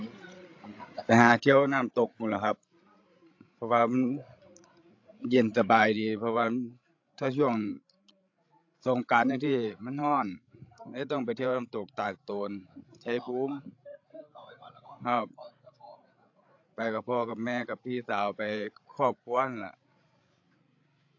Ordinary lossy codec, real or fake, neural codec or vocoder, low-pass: AAC, 48 kbps; fake; codec, 16 kHz, 16 kbps, FreqCodec, larger model; 7.2 kHz